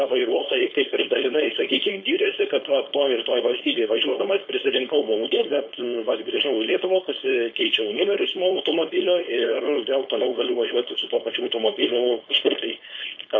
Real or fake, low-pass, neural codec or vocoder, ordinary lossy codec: fake; 7.2 kHz; codec, 16 kHz, 4.8 kbps, FACodec; MP3, 32 kbps